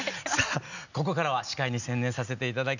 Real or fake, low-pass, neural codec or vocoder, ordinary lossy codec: real; 7.2 kHz; none; none